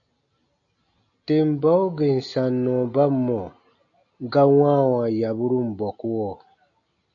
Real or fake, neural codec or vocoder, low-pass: real; none; 7.2 kHz